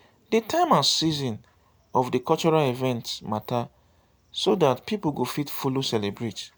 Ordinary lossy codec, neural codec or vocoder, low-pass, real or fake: none; none; none; real